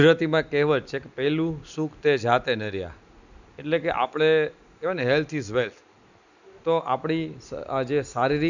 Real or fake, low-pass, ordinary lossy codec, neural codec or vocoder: real; 7.2 kHz; none; none